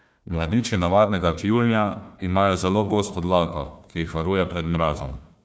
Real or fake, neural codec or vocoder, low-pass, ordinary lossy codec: fake; codec, 16 kHz, 1 kbps, FunCodec, trained on Chinese and English, 50 frames a second; none; none